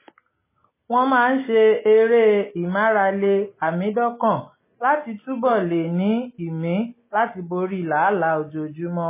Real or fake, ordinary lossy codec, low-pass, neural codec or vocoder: real; MP3, 16 kbps; 3.6 kHz; none